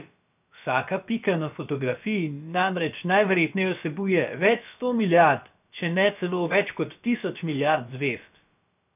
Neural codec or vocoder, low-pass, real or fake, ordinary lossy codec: codec, 16 kHz, about 1 kbps, DyCAST, with the encoder's durations; 3.6 kHz; fake; none